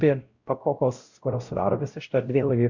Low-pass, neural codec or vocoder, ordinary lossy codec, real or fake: 7.2 kHz; codec, 16 kHz, 0.5 kbps, X-Codec, WavLM features, trained on Multilingual LibriSpeech; Opus, 64 kbps; fake